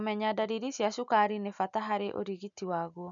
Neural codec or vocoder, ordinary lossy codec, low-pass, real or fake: none; MP3, 96 kbps; 7.2 kHz; real